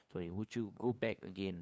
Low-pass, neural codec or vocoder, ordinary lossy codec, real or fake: none; codec, 16 kHz, 2 kbps, FunCodec, trained on LibriTTS, 25 frames a second; none; fake